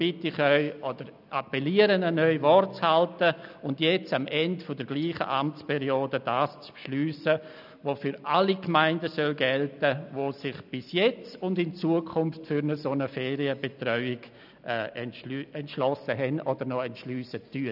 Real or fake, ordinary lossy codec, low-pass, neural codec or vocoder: real; none; 5.4 kHz; none